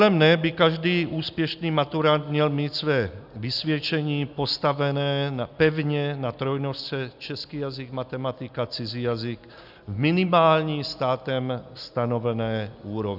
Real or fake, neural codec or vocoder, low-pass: real; none; 5.4 kHz